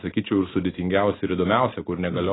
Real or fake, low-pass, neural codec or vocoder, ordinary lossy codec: real; 7.2 kHz; none; AAC, 16 kbps